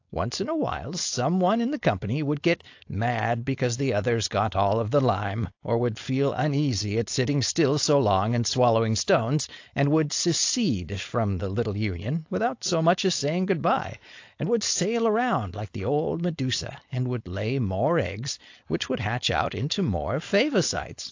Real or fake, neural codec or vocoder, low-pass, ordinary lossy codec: fake; codec, 16 kHz, 4.8 kbps, FACodec; 7.2 kHz; AAC, 48 kbps